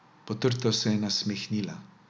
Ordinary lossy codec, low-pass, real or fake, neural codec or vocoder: none; none; real; none